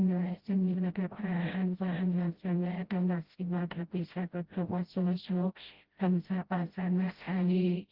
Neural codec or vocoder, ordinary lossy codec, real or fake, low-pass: codec, 16 kHz, 0.5 kbps, FreqCodec, smaller model; Opus, 16 kbps; fake; 5.4 kHz